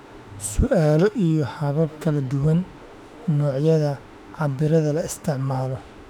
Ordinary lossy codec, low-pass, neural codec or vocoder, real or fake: none; 19.8 kHz; autoencoder, 48 kHz, 32 numbers a frame, DAC-VAE, trained on Japanese speech; fake